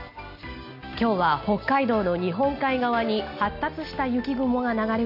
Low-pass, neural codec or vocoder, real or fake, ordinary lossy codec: 5.4 kHz; none; real; none